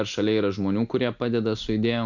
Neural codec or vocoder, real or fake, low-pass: none; real; 7.2 kHz